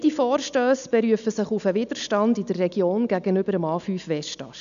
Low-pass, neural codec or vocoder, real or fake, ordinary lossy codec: 7.2 kHz; none; real; none